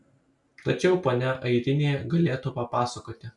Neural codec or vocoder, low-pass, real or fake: none; 10.8 kHz; real